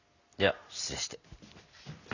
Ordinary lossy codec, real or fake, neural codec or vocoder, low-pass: none; real; none; 7.2 kHz